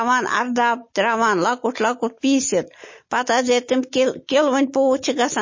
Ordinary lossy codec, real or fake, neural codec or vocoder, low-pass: MP3, 32 kbps; real; none; 7.2 kHz